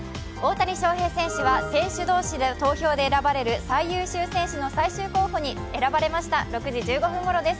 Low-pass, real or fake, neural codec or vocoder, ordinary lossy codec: none; real; none; none